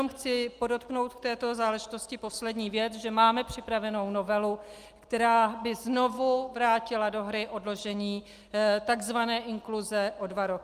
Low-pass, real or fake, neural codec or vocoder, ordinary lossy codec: 14.4 kHz; real; none; Opus, 32 kbps